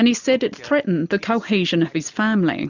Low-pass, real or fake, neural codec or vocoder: 7.2 kHz; real; none